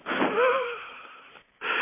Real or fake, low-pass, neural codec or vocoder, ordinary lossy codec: real; 3.6 kHz; none; MP3, 24 kbps